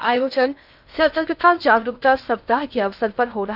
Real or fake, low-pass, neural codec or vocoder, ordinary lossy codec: fake; 5.4 kHz; codec, 16 kHz in and 24 kHz out, 0.6 kbps, FocalCodec, streaming, 2048 codes; none